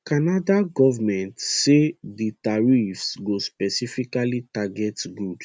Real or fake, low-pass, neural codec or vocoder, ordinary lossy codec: real; none; none; none